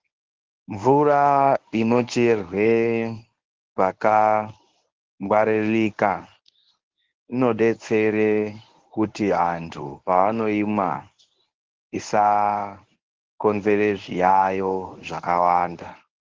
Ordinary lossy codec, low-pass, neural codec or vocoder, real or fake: Opus, 16 kbps; 7.2 kHz; codec, 24 kHz, 0.9 kbps, WavTokenizer, medium speech release version 1; fake